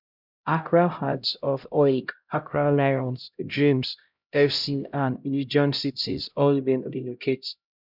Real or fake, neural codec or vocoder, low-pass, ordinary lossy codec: fake; codec, 16 kHz, 0.5 kbps, X-Codec, HuBERT features, trained on LibriSpeech; 5.4 kHz; none